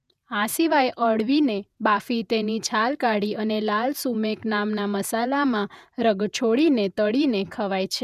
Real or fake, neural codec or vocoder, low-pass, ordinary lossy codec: fake; vocoder, 48 kHz, 128 mel bands, Vocos; 14.4 kHz; none